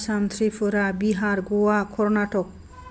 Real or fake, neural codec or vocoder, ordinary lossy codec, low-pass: real; none; none; none